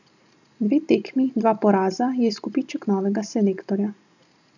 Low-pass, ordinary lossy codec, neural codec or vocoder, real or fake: 7.2 kHz; none; none; real